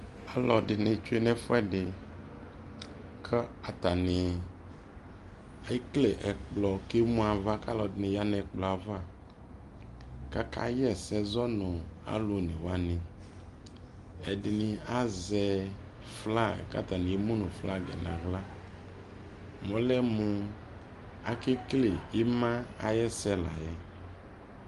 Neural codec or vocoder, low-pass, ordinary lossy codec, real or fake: none; 10.8 kHz; Opus, 32 kbps; real